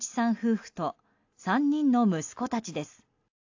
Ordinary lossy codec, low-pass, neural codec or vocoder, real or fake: AAC, 48 kbps; 7.2 kHz; none; real